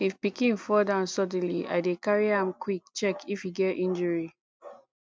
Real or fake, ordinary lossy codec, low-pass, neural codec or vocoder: real; none; none; none